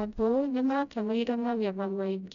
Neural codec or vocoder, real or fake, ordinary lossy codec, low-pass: codec, 16 kHz, 0.5 kbps, FreqCodec, smaller model; fake; none; 7.2 kHz